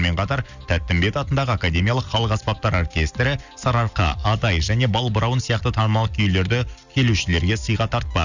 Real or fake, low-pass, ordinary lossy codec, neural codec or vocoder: real; 7.2 kHz; none; none